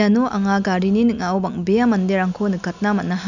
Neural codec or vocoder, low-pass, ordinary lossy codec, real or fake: none; 7.2 kHz; none; real